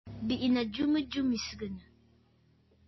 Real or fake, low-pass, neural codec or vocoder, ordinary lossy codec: real; 7.2 kHz; none; MP3, 24 kbps